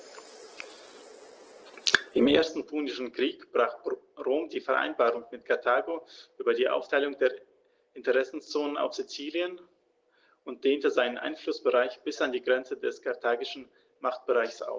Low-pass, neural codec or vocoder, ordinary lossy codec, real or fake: 7.2 kHz; none; Opus, 16 kbps; real